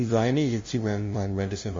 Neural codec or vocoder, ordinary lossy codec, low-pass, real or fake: codec, 16 kHz, 0.5 kbps, FunCodec, trained on LibriTTS, 25 frames a second; MP3, 32 kbps; 7.2 kHz; fake